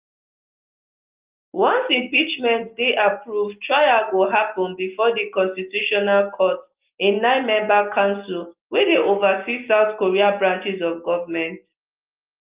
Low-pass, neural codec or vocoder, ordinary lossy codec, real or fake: 3.6 kHz; none; Opus, 32 kbps; real